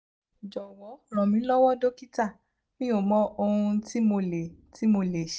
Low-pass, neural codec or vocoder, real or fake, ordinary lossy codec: none; none; real; none